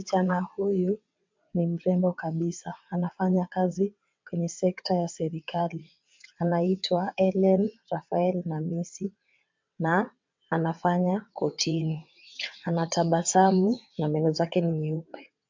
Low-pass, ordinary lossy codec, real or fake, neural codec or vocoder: 7.2 kHz; MP3, 64 kbps; fake; vocoder, 22.05 kHz, 80 mel bands, WaveNeXt